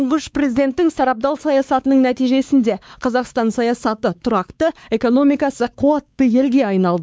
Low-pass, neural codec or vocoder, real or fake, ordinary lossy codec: none; codec, 16 kHz, 4 kbps, X-Codec, WavLM features, trained on Multilingual LibriSpeech; fake; none